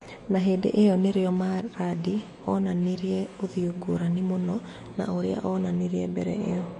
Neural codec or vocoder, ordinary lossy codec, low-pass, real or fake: autoencoder, 48 kHz, 128 numbers a frame, DAC-VAE, trained on Japanese speech; MP3, 48 kbps; 14.4 kHz; fake